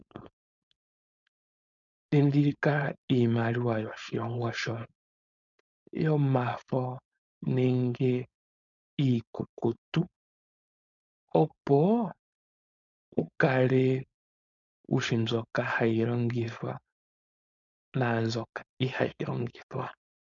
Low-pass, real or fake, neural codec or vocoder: 7.2 kHz; fake; codec, 16 kHz, 4.8 kbps, FACodec